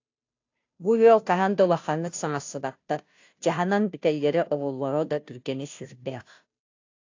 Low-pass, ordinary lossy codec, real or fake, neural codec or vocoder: 7.2 kHz; AAC, 48 kbps; fake; codec, 16 kHz, 0.5 kbps, FunCodec, trained on Chinese and English, 25 frames a second